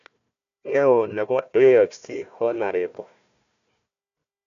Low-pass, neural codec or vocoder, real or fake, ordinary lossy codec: 7.2 kHz; codec, 16 kHz, 1 kbps, FunCodec, trained on Chinese and English, 50 frames a second; fake; none